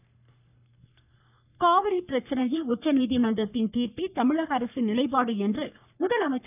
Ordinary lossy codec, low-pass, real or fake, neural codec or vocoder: none; 3.6 kHz; fake; codec, 44.1 kHz, 3.4 kbps, Pupu-Codec